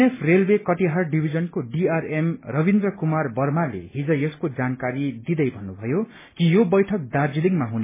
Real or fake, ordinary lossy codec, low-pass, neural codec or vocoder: real; MP3, 16 kbps; 3.6 kHz; none